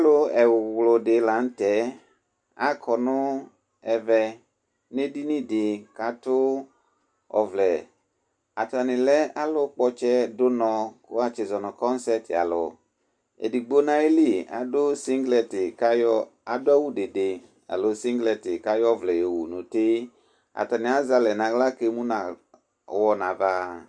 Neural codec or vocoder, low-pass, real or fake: none; 9.9 kHz; real